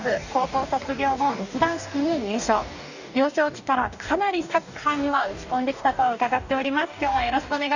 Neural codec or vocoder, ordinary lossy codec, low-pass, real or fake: codec, 44.1 kHz, 2.6 kbps, DAC; none; 7.2 kHz; fake